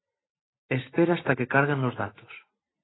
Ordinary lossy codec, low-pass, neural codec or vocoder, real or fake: AAC, 16 kbps; 7.2 kHz; none; real